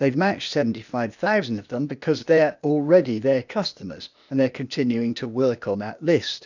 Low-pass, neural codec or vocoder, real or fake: 7.2 kHz; codec, 16 kHz, 0.8 kbps, ZipCodec; fake